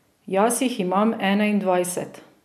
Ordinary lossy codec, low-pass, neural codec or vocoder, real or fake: none; 14.4 kHz; none; real